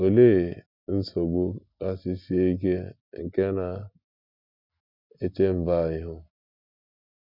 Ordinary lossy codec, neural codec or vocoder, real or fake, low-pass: none; none; real; 5.4 kHz